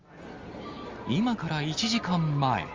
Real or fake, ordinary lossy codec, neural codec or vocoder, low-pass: real; Opus, 32 kbps; none; 7.2 kHz